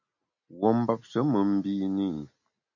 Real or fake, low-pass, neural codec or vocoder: real; 7.2 kHz; none